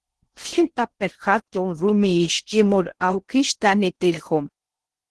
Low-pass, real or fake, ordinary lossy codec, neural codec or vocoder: 10.8 kHz; fake; Opus, 16 kbps; codec, 16 kHz in and 24 kHz out, 0.6 kbps, FocalCodec, streaming, 4096 codes